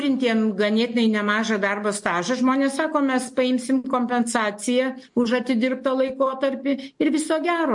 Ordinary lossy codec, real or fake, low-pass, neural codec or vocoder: MP3, 48 kbps; real; 10.8 kHz; none